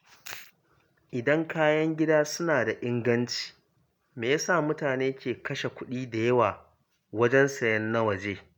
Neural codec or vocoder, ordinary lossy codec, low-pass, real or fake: none; none; none; real